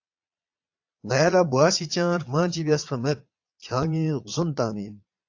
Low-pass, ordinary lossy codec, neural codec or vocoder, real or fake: 7.2 kHz; AAC, 48 kbps; vocoder, 22.05 kHz, 80 mel bands, Vocos; fake